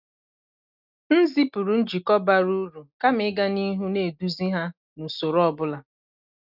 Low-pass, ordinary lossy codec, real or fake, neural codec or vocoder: 5.4 kHz; none; real; none